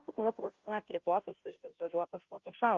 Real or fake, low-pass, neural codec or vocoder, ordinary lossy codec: fake; 7.2 kHz; codec, 16 kHz, 0.5 kbps, FunCodec, trained on Chinese and English, 25 frames a second; Opus, 32 kbps